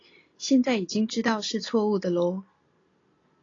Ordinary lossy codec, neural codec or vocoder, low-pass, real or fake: AAC, 32 kbps; none; 7.2 kHz; real